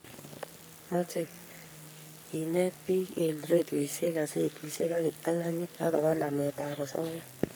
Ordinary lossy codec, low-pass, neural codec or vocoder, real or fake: none; none; codec, 44.1 kHz, 3.4 kbps, Pupu-Codec; fake